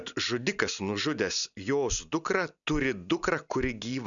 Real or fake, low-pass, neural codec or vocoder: real; 7.2 kHz; none